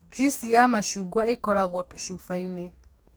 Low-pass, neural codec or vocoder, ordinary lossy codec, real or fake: none; codec, 44.1 kHz, 2.6 kbps, DAC; none; fake